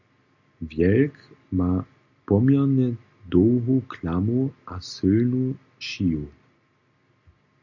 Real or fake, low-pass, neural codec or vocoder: real; 7.2 kHz; none